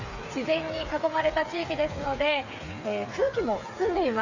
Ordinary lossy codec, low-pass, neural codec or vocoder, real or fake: AAC, 48 kbps; 7.2 kHz; codec, 16 kHz, 8 kbps, FreqCodec, smaller model; fake